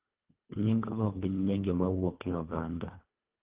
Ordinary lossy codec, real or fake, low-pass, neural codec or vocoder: Opus, 16 kbps; fake; 3.6 kHz; codec, 24 kHz, 1.5 kbps, HILCodec